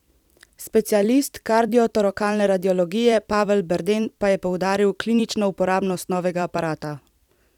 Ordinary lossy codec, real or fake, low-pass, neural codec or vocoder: none; fake; 19.8 kHz; vocoder, 44.1 kHz, 128 mel bands every 256 samples, BigVGAN v2